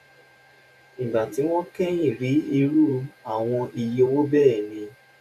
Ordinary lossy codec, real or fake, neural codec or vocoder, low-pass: none; fake; vocoder, 44.1 kHz, 128 mel bands every 512 samples, BigVGAN v2; 14.4 kHz